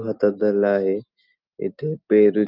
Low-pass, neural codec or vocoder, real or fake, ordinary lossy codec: 5.4 kHz; none; real; Opus, 24 kbps